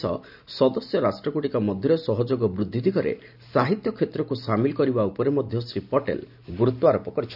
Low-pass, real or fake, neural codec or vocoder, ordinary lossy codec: 5.4 kHz; real; none; none